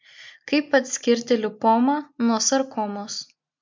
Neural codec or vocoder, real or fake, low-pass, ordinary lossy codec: none; real; 7.2 kHz; MP3, 64 kbps